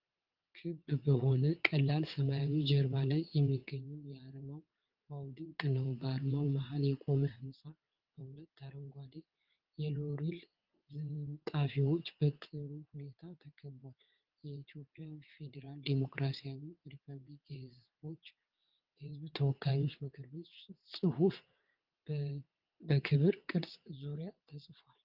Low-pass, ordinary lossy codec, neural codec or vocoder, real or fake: 5.4 kHz; Opus, 32 kbps; vocoder, 22.05 kHz, 80 mel bands, WaveNeXt; fake